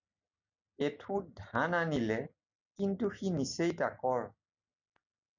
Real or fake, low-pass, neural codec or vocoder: real; 7.2 kHz; none